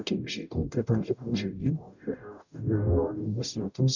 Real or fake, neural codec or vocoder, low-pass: fake; codec, 44.1 kHz, 0.9 kbps, DAC; 7.2 kHz